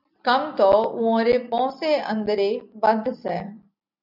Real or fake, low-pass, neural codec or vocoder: real; 5.4 kHz; none